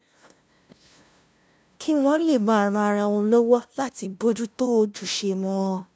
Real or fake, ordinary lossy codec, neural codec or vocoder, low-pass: fake; none; codec, 16 kHz, 0.5 kbps, FunCodec, trained on LibriTTS, 25 frames a second; none